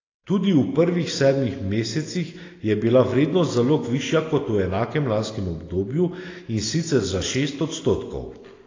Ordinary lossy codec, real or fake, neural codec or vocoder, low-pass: AAC, 32 kbps; real; none; 7.2 kHz